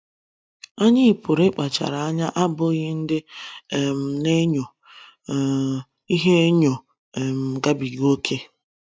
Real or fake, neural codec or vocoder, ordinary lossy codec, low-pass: real; none; none; none